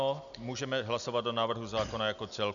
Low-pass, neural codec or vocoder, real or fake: 7.2 kHz; none; real